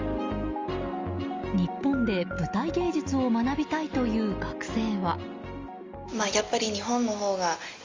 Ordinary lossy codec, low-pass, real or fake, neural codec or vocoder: Opus, 32 kbps; 7.2 kHz; real; none